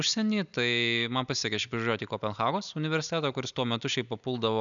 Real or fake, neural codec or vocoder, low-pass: real; none; 7.2 kHz